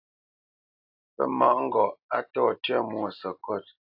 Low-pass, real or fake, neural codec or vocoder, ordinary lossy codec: 5.4 kHz; real; none; AAC, 48 kbps